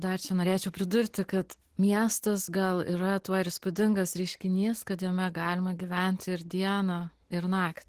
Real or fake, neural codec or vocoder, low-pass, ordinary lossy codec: real; none; 14.4 kHz; Opus, 16 kbps